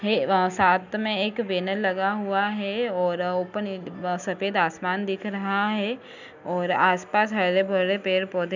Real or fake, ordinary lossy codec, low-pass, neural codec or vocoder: real; none; 7.2 kHz; none